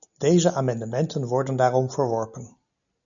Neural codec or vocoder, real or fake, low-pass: none; real; 7.2 kHz